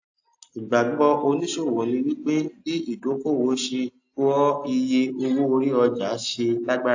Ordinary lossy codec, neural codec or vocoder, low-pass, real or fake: AAC, 48 kbps; none; 7.2 kHz; real